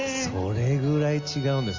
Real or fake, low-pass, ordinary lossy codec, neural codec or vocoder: real; 7.2 kHz; Opus, 32 kbps; none